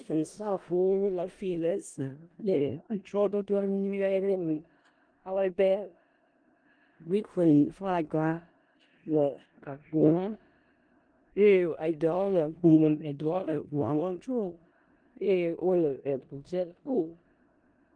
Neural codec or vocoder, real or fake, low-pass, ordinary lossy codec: codec, 16 kHz in and 24 kHz out, 0.4 kbps, LongCat-Audio-Codec, four codebook decoder; fake; 9.9 kHz; Opus, 24 kbps